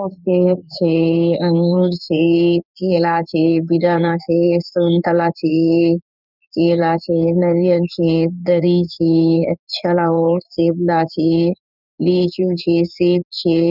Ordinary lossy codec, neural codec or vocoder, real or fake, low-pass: none; codec, 16 kHz in and 24 kHz out, 1 kbps, XY-Tokenizer; fake; 5.4 kHz